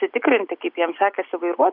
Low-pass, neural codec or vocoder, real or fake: 5.4 kHz; none; real